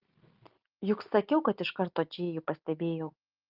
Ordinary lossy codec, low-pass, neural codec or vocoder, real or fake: Opus, 32 kbps; 5.4 kHz; none; real